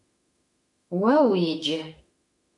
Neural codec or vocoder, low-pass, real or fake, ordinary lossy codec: autoencoder, 48 kHz, 32 numbers a frame, DAC-VAE, trained on Japanese speech; 10.8 kHz; fake; MP3, 96 kbps